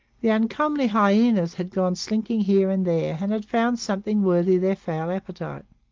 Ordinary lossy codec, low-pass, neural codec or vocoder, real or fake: Opus, 32 kbps; 7.2 kHz; none; real